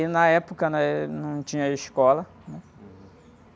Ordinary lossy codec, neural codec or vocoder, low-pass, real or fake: none; none; none; real